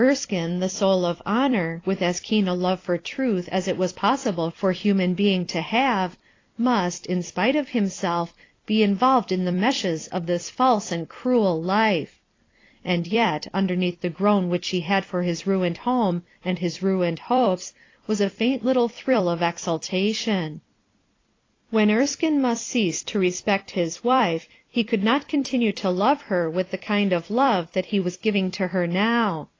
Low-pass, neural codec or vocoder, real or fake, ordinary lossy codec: 7.2 kHz; vocoder, 44.1 kHz, 128 mel bands every 256 samples, BigVGAN v2; fake; AAC, 32 kbps